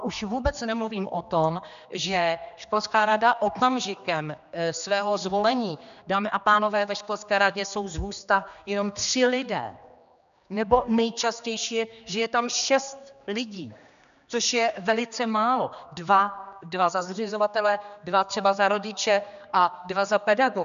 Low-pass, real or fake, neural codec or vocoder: 7.2 kHz; fake; codec, 16 kHz, 2 kbps, X-Codec, HuBERT features, trained on general audio